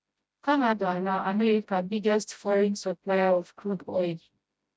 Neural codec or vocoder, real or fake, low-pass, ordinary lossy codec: codec, 16 kHz, 0.5 kbps, FreqCodec, smaller model; fake; none; none